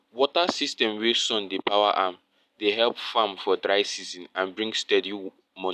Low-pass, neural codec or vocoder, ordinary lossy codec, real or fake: 14.4 kHz; none; none; real